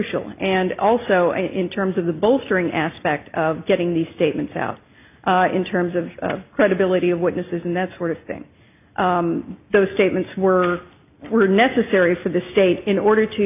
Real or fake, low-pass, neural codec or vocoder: real; 3.6 kHz; none